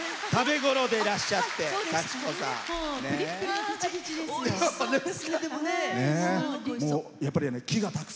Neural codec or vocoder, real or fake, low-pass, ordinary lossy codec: none; real; none; none